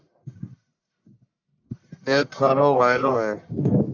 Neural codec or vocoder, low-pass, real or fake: codec, 44.1 kHz, 1.7 kbps, Pupu-Codec; 7.2 kHz; fake